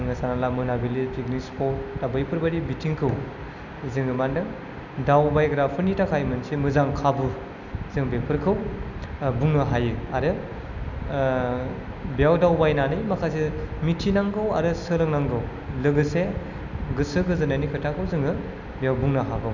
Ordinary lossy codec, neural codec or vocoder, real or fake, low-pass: none; none; real; 7.2 kHz